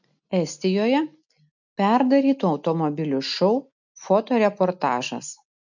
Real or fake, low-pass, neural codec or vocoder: real; 7.2 kHz; none